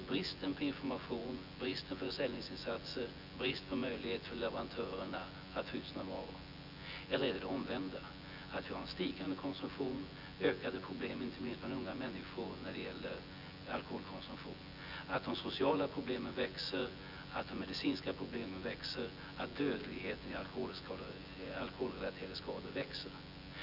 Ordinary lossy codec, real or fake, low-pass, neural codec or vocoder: none; fake; 5.4 kHz; vocoder, 24 kHz, 100 mel bands, Vocos